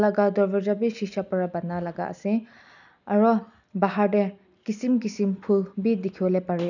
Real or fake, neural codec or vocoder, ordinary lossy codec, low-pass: real; none; none; 7.2 kHz